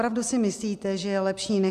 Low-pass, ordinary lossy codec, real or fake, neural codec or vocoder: 14.4 kHz; MP3, 96 kbps; real; none